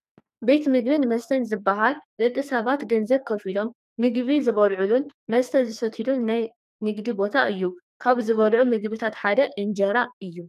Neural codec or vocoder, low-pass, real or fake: codec, 44.1 kHz, 2.6 kbps, SNAC; 14.4 kHz; fake